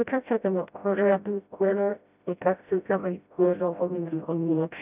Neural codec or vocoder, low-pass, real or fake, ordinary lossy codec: codec, 16 kHz, 0.5 kbps, FreqCodec, smaller model; 3.6 kHz; fake; none